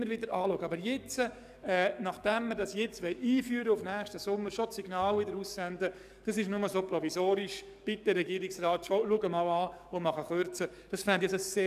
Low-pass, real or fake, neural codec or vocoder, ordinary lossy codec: 14.4 kHz; fake; codec, 44.1 kHz, 7.8 kbps, DAC; none